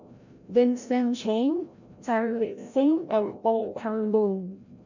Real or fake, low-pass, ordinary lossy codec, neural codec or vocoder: fake; 7.2 kHz; none; codec, 16 kHz, 0.5 kbps, FreqCodec, larger model